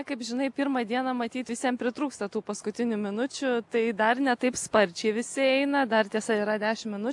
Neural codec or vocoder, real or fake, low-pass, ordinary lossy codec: none; real; 10.8 kHz; AAC, 64 kbps